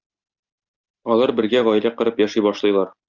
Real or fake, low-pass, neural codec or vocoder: real; 7.2 kHz; none